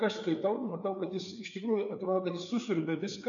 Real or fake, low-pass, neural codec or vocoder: fake; 7.2 kHz; codec, 16 kHz, 4 kbps, FreqCodec, larger model